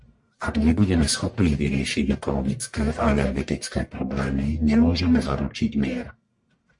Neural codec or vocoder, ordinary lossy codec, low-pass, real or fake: codec, 44.1 kHz, 1.7 kbps, Pupu-Codec; MP3, 48 kbps; 10.8 kHz; fake